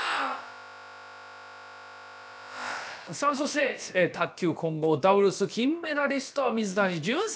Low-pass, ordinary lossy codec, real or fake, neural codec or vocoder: none; none; fake; codec, 16 kHz, about 1 kbps, DyCAST, with the encoder's durations